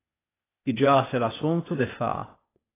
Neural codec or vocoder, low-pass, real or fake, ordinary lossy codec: codec, 16 kHz, 0.8 kbps, ZipCodec; 3.6 kHz; fake; AAC, 16 kbps